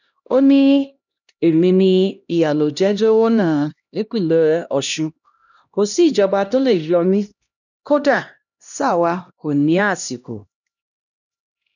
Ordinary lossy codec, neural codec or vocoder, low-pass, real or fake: none; codec, 16 kHz, 1 kbps, X-Codec, HuBERT features, trained on LibriSpeech; 7.2 kHz; fake